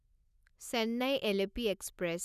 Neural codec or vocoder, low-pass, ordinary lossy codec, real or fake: none; 14.4 kHz; none; real